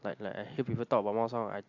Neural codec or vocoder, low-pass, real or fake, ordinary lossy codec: none; 7.2 kHz; real; AAC, 48 kbps